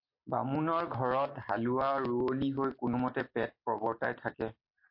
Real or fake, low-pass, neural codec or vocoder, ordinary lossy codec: real; 5.4 kHz; none; MP3, 32 kbps